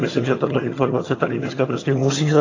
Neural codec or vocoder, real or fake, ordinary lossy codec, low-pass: vocoder, 22.05 kHz, 80 mel bands, HiFi-GAN; fake; AAC, 32 kbps; 7.2 kHz